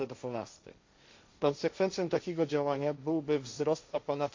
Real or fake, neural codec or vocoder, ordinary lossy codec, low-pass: fake; codec, 16 kHz, 1.1 kbps, Voila-Tokenizer; MP3, 48 kbps; 7.2 kHz